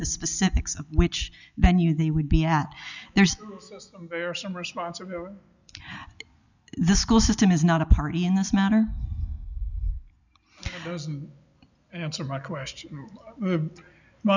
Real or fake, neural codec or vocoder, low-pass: real; none; 7.2 kHz